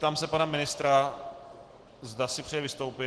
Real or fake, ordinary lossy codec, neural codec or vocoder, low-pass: real; Opus, 16 kbps; none; 10.8 kHz